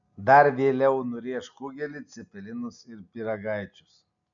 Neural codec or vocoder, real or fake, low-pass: none; real; 7.2 kHz